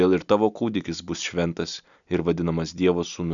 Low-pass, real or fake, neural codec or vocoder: 7.2 kHz; real; none